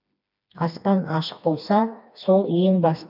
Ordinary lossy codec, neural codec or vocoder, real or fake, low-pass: none; codec, 16 kHz, 2 kbps, FreqCodec, smaller model; fake; 5.4 kHz